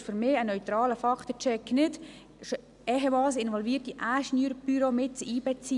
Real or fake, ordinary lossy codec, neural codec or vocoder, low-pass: real; none; none; 10.8 kHz